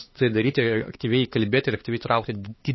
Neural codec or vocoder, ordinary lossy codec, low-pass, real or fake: codec, 16 kHz, 4 kbps, X-Codec, HuBERT features, trained on LibriSpeech; MP3, 24 kbps; 7.2 kHz; fake